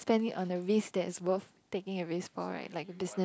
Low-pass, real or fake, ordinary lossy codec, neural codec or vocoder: none; real; none; none